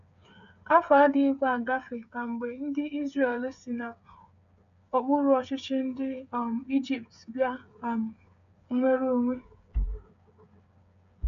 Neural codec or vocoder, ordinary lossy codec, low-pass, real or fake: codec, 16 kHz, 8 kbps, FreqCodec, smaller model; none; 7.2 kHz; fake